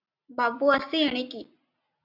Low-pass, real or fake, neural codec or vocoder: 5.4 kHz; real; none